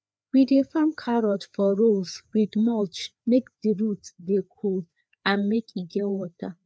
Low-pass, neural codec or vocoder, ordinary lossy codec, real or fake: none; codec, 16 kHz, 4 kbps, FreqCodec, larger model; none; fake